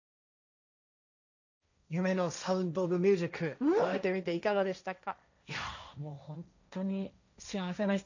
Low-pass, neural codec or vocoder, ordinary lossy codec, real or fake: 7.2 kHz; codec, 16 kHz, 1.1 kbps, Voila-Tokenizer; none; fake